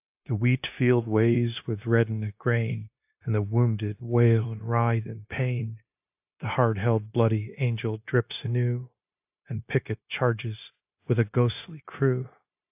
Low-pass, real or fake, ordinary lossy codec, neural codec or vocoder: 3.6 kHz; fake; AAC, 32 kbps; codec, 16 kHz, 0.9 kbps, LongCat-Audio-Codec